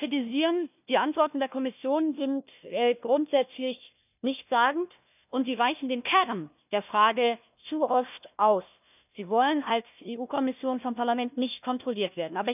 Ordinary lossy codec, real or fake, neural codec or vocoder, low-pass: none; fake; codec, 16 kHz, 1 kbps, FunCodec, trained on Chinese and English, 50 frames a second; 3.6 kHz